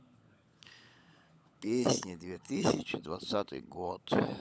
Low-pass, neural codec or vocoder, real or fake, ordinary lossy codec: none; codec, 16 kHz, 16 kbps, FunCodec, trained on LibriTTS, 50 frames a second; fake; none